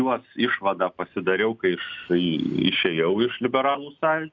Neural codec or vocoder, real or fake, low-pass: none; real; 7.2 kHz